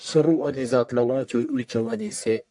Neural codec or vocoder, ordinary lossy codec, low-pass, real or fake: codec, 44.1 kHz, 1.7 kbps, Pupu-Codec; MP3, 64 kbps; 10.8 kHz; fake